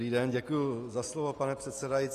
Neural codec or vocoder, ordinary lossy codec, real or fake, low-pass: none; AAC, 48 kbps; real; 14.4 kHz